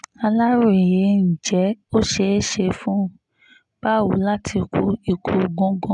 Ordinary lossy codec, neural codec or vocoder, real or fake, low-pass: none; none; real; 10.8 kHz